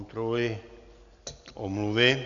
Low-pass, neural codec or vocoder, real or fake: 7.2 kHz; none; real